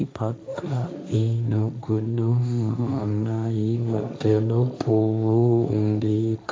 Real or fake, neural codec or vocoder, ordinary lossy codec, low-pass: fake; codec, 16 kHz, 1.1 kbps, Voila-Tokenizer; none; none